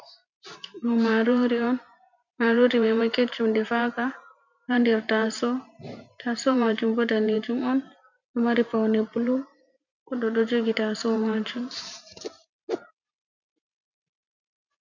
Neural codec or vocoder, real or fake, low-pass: vocoder, 44.1 kHz, 128 mel bands every 512 samples, BigVGAN v2; fake; 7.2 kHz